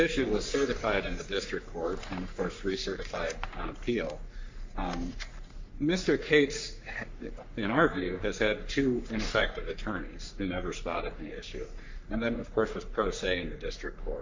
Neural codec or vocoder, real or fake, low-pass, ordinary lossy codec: codec, 44.1 kHz, 3.4 kbps, Pupu-Codec; fake; 7.2 kHz; MP3, 48 kbps